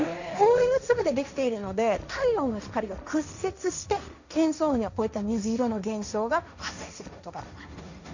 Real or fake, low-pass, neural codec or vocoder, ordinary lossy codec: fake; 7.2 kHz; codec, 16 kHz, 1.1 kbps, Voila-Tokenizer; none